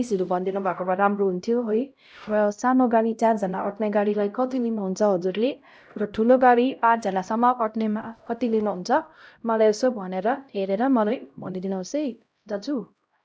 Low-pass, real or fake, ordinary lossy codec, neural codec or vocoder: none; fake; none; codec, 16 kHz, 0.5 kbps, X-Codec, HuBERT features, trained on LibriSpeech